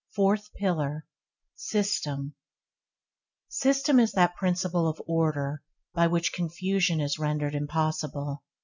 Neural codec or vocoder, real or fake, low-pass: none; real; 7.2 kHz